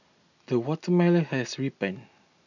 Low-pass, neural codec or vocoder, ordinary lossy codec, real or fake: 7.2 kHz; none; none; real